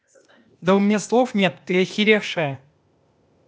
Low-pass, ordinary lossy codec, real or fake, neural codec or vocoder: none; none; fake; codec, 16 kHz, 0.8 kbps, ZipCodec